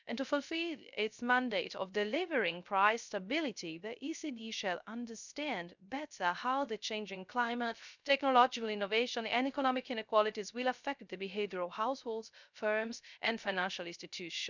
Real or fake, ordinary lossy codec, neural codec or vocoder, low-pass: fake; none; codec, 16 kHz, 0.3 kbps, FocalCodec; 7.2 kHz